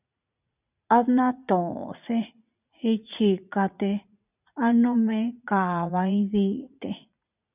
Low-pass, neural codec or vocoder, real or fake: 3.6 kHz; vocoder, 44.1 kHz, 80 mel bands, Vocos; fake